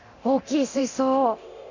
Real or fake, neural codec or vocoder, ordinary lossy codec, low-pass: fake; codec, 24 kHz, 0.9 kbps, DualCodec; none; 7.2 kHz